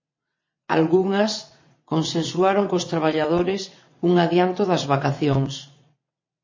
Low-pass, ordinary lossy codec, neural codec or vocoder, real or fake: 7.2 kHz; MP3, 32 kbps; vocoder, 22.05 kHz, 80 mel bands, WaveNeXt; fake